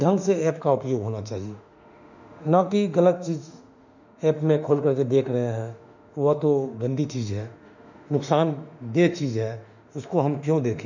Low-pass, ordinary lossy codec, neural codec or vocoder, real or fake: 7.2 kHz; none; autoencoder, 48 kHz, 32 numbers a frame, DAC-VAE, trained on Japanese speech; fake